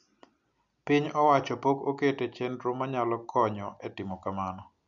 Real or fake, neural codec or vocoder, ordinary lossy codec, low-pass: real; none; none; 7.2 kHz